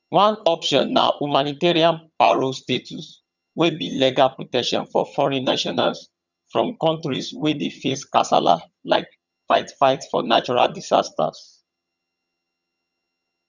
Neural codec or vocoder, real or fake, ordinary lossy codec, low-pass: vocoder, 22.05 kHz, 80 mel bands, HiFi-GAN; fake; none; 7.2 kHz